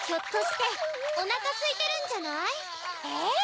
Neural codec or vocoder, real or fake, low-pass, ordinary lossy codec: none; real; none; none